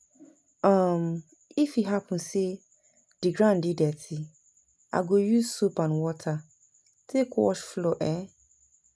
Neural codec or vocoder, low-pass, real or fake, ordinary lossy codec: none; none; real; none